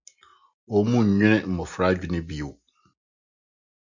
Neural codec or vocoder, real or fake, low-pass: none; real; 7.2 kHz